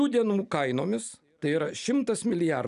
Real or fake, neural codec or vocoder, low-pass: real; none; 10.8 kHz